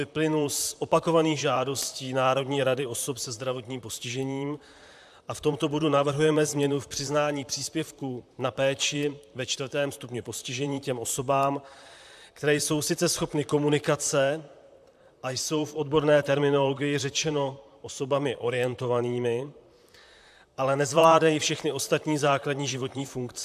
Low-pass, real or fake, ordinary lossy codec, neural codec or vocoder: 14.4 kHz; fake; AAC, 96 kbps; vocoder, 44.1 kHz, 128 mel bands, Pupu-Vocoder